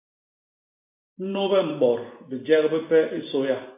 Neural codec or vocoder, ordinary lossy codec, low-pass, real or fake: vocoder, 44.1 kHz, 128 mel bands every 512 samples, BigVGAN v2; AAC, 24 kbps; 3.6 kHz; fake